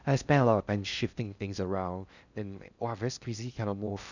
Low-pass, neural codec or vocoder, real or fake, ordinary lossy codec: 7.2 kHz; codec, 16 kHz in and 24 kHz out, 0.6 kbps, FocalCodec, streaming, 4096 codes; fake; none